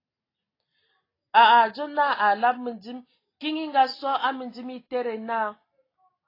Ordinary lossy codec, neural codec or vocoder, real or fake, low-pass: AAC, 24 kbps; none; real; 5.4 kHz